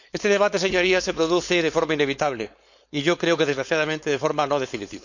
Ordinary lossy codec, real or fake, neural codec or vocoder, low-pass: none; fake; codec, 16 kHz, 4.8 kbps, FACodec; 7.2 kHz